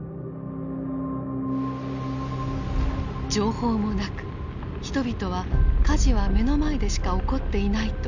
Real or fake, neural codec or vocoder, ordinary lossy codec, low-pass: real; none; none; 7.2 kHz